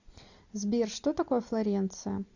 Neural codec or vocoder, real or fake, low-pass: none; real; 7.2 kHz